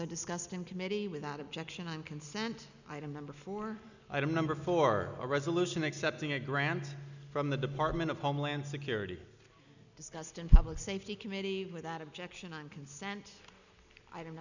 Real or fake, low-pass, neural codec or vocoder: real; 7.2 kHz; none